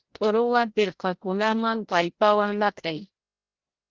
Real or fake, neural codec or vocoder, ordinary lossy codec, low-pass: fake; codec, 16 kHz, 0.5 kbps, FreqCodec, larger model; Opus, 16 kbps; 7.2 kHz